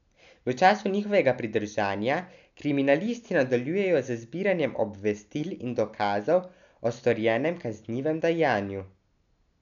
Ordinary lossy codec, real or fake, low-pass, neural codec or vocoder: none; real; 7.2 kHz; none